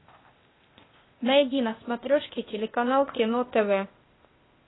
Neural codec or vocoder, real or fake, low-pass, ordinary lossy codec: codec, 16 kHz, 0.8 kbps, ZipCodec; fake; 7.2 kHz; AAC, 16 kbps